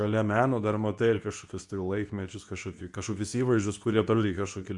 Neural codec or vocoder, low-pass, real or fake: codec, 24 kHz, 0.9 kbps, WavTokenizer, medium speech release version 2; 10.8 kHz; fake